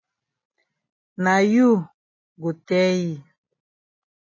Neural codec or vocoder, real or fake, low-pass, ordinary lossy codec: none; real; 7.2 kHz; MP3, 32 kbps